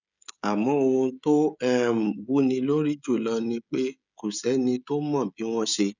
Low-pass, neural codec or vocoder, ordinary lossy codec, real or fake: 7.2 kHz; codec, 16 kHz, 16 kbps, FreqCodec, smaller model; none; fake